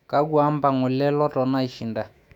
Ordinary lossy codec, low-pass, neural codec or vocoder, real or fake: none; 19.8 kHz; autoencoder, 48 kHz, 128 numbers a frame, DAC-VAE, trained on Japanese speech; fake